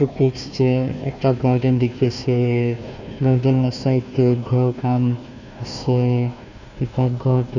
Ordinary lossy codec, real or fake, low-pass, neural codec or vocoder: none; fake; 7.2 kHz; codec, 16 kHz, 1 kbps, FunCodec, trained on Chinese and English, 50 frames a second